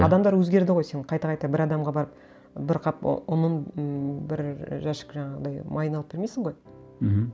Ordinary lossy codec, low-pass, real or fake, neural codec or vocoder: none; none; real; none